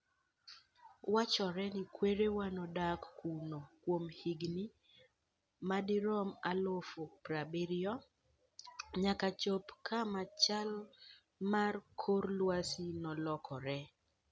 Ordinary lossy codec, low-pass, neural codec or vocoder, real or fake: none; none; none; real